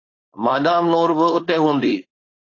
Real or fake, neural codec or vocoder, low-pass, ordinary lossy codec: fake; codec, 16 kHz, 4.8 kbps, FACodec; 7.2 kHz; AAC, 32 kbps